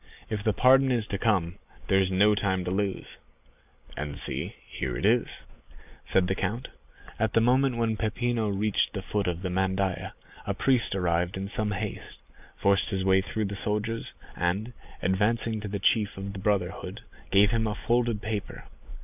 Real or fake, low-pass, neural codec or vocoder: real; 3.6 kHz; none